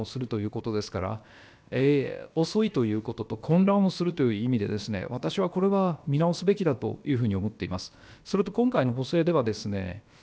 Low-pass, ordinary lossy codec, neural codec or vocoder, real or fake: none; none; codec, 16 kHz, about 1 kbps, DyCAST, with the encoder's durations; fake